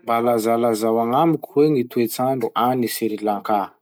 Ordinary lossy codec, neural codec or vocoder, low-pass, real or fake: none; none; none; real